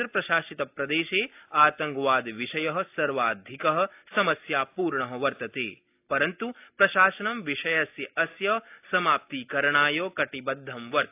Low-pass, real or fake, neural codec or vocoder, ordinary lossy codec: 3.6 kHz; real; none; AAC, 32 kbps